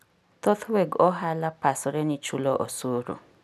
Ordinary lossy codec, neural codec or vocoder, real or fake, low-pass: none; none; real; 14.4 kHz